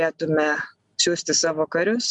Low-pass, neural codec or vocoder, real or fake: 10.8 kHz; none; real